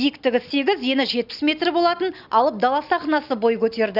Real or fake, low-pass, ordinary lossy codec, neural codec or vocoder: real; 5.4 kHz; none; none